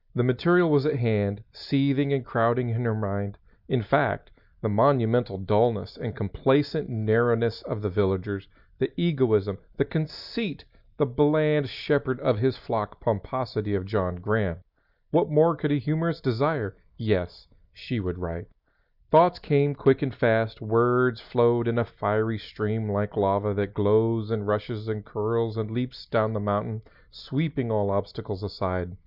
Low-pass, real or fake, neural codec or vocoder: 5.4 kHz; real; none